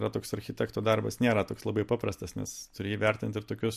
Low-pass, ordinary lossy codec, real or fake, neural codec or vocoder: 14.4 kHz; MP3, 64 kbps; real; none